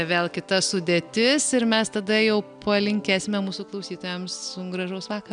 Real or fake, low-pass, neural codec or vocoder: real; 9.9 kHz; none